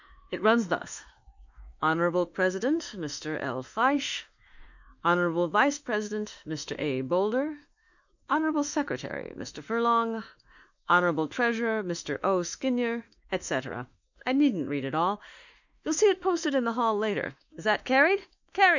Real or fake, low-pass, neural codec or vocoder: fake; 7.2 kHz; autoencoder, 48 kHz, 32 numbers a frame, DAC-VAE, trained on Japanese speech